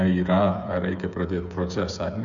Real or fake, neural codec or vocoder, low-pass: fake; codec, 16 kHz, 8 kbps, FreqCodec, smaller model; 7.2 kHz